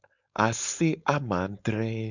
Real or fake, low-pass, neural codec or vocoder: fake; 7.2 kHz; codec, 16 kHz, 4.8 kbps, FACodec